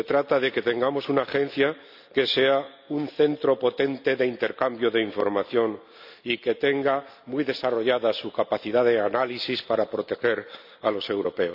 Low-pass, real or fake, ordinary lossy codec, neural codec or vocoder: 5.4 kHz; real; none; none